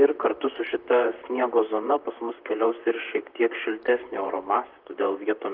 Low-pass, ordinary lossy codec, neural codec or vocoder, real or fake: 5.4 kHz; Opus, 32 kbps; vocoder, 44.1 kHz, 128 mel bands, Pupu-Vocoder; fake